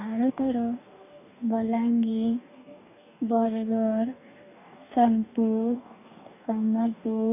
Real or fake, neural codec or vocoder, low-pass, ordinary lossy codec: fake; codec, 44.1 kHz, 2.6 kbps, DAC; 3.6 kHz; none